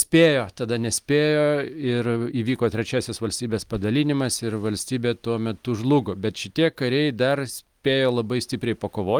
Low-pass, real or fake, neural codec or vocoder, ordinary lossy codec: 19.8 kHz; real; none; Opus, 32 kbps